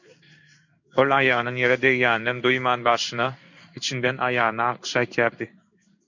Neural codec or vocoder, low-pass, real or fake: codec, 16 kHz in and 24 kHz out, 1 kbps, XY-Tokenizer; 7.2 kHz; fake